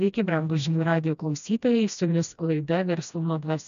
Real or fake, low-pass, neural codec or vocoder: fake; 7.2 kHz; codec, 16 kHz, 1 kbps, FreqCodec, smaller model